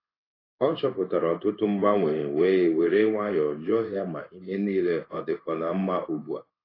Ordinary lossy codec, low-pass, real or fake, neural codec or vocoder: AAC, 24 kbps; 5.4 kHz; fake; codec, 16 kHz in and 24 kHz out, 1 kbps, XY-Tokenizer